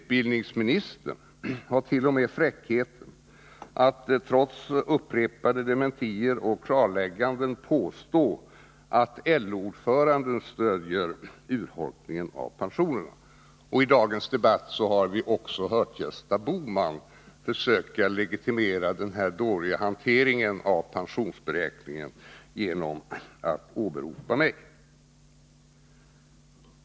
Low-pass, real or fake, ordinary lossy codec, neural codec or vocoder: none; real; none; none